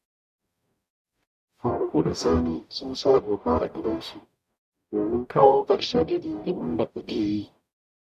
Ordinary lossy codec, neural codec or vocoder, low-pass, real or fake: none; codec, 44.1 kHz, 0.9 kbps, DAC; 14.4 kHz; fake